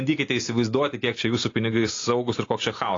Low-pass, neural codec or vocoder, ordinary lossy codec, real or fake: 7.2 kHz; none; AAC, 32 kbps; real